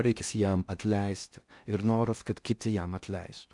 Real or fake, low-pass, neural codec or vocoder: fake; 10.8 kHz; codec, 16 kHz in and 24 kHz out, 0.6 kbps, FocalCodec, streaming, 4096 codes